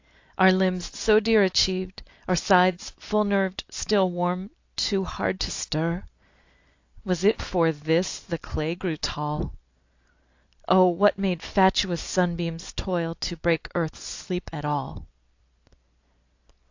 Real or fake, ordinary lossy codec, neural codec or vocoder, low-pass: real; AAC, 48 kbps; none; 7.2 kHz